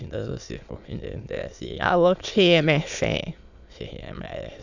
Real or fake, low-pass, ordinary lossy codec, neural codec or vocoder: fake; 7.2 kHz; none; autoencoder, 22.05 kHz, a latent of 192 numbers a frame, VITS, trained on many speakers